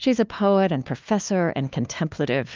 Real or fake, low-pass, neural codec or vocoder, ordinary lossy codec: fake; 7.2 kHz; codec, 16 kHz, 2 kbps, FunCodec, trained on LibriTTS, 25 frames a second; Opus, 24 kbps